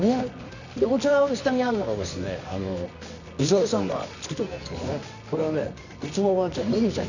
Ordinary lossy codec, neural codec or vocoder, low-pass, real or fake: none; codec, 24 kHz, 0.9 kbps, WavTokenizer, medium music audio release; 7.2 kHz; fake